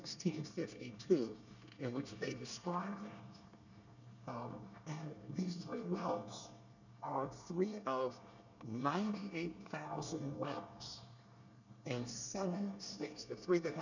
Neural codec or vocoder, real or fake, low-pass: codec, 24 kHz, 1 kbps, SNAC; fake; 7.2 kHz